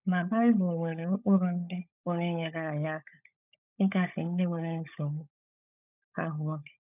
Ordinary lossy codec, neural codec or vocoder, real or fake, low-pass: none; codec, 16 kHz, 16 kbps, FunCodec, trained on LibriTTS, 50 frames a second; fake; 3.6 kHz